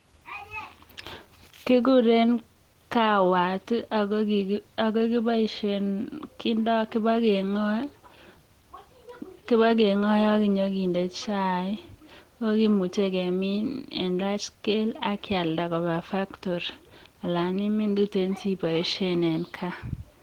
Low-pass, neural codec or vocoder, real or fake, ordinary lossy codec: 19.8 kHz; none; real; Opus, 16 kbps